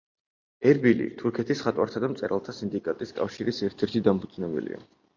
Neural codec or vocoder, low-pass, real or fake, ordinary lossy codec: none; 7.2 kHz; real; MP3, 64 kbps